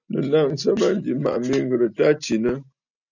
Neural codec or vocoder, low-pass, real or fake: none; 7.2 kHz; real